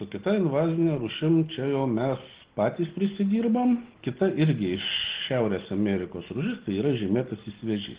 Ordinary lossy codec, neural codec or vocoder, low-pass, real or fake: Opus, 16 kbps; none; 3.6 kHz; real